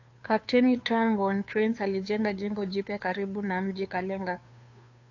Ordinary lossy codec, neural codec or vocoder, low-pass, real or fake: AAC, 48 kbps; codec, 16 kHz, 2 kbps, FunCodec, trained on Chinese and English, 25 frames a second; 7.2 kHz; fake